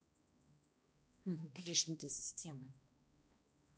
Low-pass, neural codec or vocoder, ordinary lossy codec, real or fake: none; codec, 16 kHz, 1 kbps, X-Codec, HuBERT features, trained on balanced general audio; none; fake